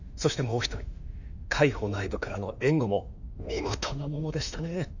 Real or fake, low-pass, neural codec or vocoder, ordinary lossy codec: fake; 7.2 kHz; autoencoder, 48 kHz, 32 numbers a frame, DAC-VAE, trained on Japanese speech; none